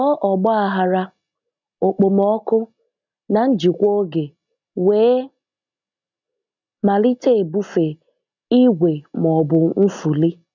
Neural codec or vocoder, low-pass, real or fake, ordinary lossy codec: none; 7.2 kHz; real; none